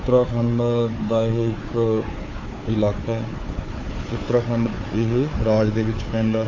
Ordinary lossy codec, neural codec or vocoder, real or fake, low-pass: AAC, 32 kbps; codec, 16 kHz, 4 kbps, FunCodec, trained on Chinese and English, 50 frames a second; fake; 7.2 kHz